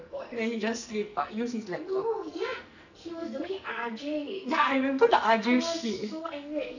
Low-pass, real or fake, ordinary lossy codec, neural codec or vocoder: 7.2 kHz; fake; none; codec, 44.1 kHz, 2.6 kbps, SNAC